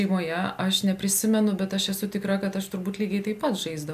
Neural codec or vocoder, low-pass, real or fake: none; 14.4 kHz; real